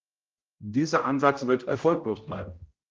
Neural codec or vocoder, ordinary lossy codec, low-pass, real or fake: codec, 16 kHz, 0.5 kbps, X-Codec, HuBERT features, trained on general audio; Opus, 24 kbps; 7.2 kHz; fake